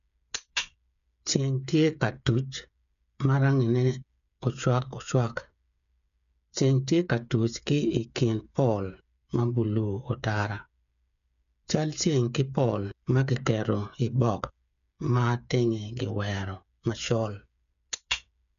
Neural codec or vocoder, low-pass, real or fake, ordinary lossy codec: codec, 16 kHz, 8 kbps, FreqCodec, smaller model; 7.2 kHz; fake; none